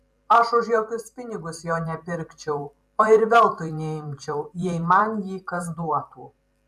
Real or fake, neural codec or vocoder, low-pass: fake; vocoder, 44.1 kHz, 128 mel bands every 512 samples, BigVGAN v2; 14.4 kHz